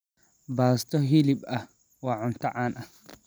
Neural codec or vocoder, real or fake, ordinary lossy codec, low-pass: none; real; none; none